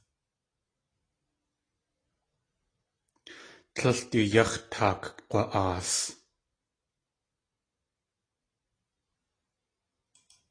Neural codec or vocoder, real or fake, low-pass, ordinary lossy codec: none; real; 9.9 kHz; AAC, 32 kbps